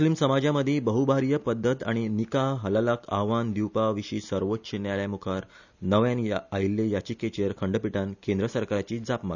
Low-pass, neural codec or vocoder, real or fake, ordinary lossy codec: 7.2 kHz; none; real; none